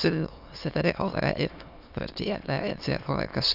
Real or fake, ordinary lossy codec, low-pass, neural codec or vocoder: fake; AAC, 48 kbps; 5.4 kHz; autoencoder, 22.05 kHz, a latent of 192 numbers a frame, VITS, trained on many speakers